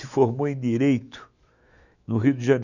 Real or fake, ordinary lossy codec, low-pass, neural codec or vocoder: fake; none; 7.2 kHz; autoencoder, 48 kHz, 128 numbers a frame, DAC-VAE, trained on Japanese speech